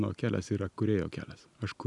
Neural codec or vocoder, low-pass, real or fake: none; 10.8 kHz; real